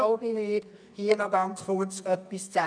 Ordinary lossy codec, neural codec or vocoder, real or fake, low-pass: none; codec, 24 kHz, 0.9 kbps, WavTokenizer, medium music audio release; fake; 9.9 kHz